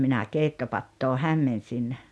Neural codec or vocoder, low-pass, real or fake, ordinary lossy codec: none; none; real; none